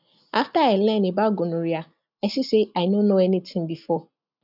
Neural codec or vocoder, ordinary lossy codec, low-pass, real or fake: none; none; 5.4 kHz; real